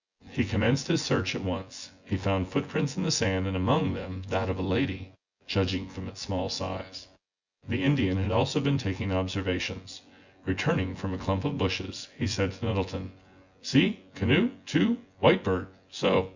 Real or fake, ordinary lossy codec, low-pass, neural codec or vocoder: fake; Opus, 64 kbps; 7.2 kHz; vocoder, 24 kHz, 100 mel bands, Vocos